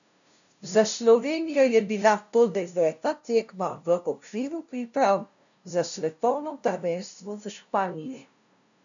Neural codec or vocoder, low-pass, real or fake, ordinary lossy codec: codec, 16 kHz, 0.5 kbps, FunCodec, trained on LibriTTS, 25 frames a second; 7.2 kHz; fake; none